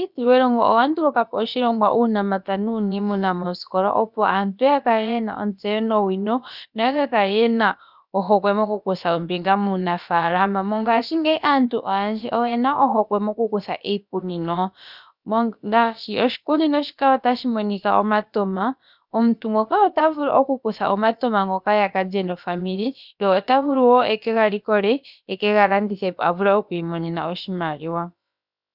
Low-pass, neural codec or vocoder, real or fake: 5.4 kHz; codec, 16 kHz, about 1 kbps, DyCAST, with the encoder's durations; fake